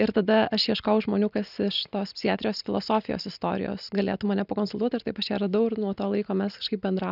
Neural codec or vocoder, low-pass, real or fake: none; 5.4 kHz; real